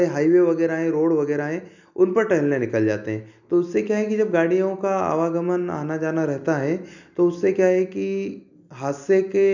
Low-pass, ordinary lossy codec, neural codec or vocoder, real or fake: 7.2 kHz; none; none; real